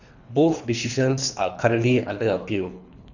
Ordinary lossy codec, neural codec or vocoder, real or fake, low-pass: none; codec, 24 kHz, 3 kbps, HILCodec; fake; 7.2 kHz